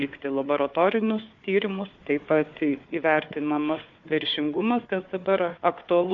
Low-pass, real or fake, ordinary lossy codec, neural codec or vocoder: 7.2 kHz; fake; MP3, 48 kbps; codec, 16 kHz, 4 kbps, FunCodec, trained on Chinese and English, 50 frames a second